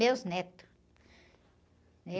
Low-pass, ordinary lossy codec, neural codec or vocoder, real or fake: none; none; none; real